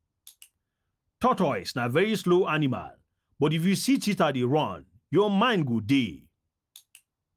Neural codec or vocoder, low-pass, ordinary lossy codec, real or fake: none; 14.4 kHz; Opus, 32 kbps; real